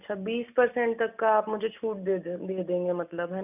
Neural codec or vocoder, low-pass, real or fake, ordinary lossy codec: none; 3.6 kHz; real; none